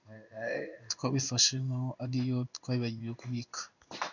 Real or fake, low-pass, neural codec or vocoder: fake; 7.2 kHz; codec, 16 kHz in and 24 kHz out, 1 kbps, XY-Tokenizer